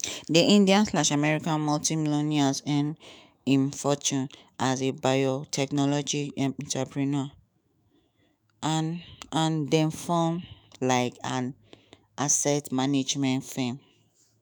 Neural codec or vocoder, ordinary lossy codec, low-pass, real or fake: autoencoder, 48 kHz, 128 numbers a frame, DAC-VAE, trained on Japanese speech; none; none; fake